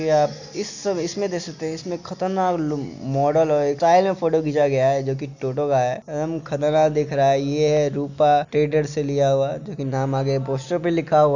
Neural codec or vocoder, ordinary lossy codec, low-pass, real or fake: none; none; 7.2 kHz; real